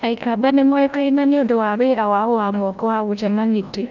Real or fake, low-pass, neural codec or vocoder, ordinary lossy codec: fake; 7.2 kHz; codec, 16 kHz, 0.5 kbps, FreqCodec, larger model; none